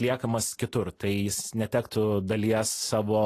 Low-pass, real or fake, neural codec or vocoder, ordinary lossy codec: 14.4 kHz; real; none; AAC, 48 kbps